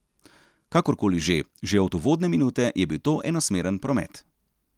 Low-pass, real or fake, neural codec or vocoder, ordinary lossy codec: 19.8 kHz; real; none; Opus, 32 kbps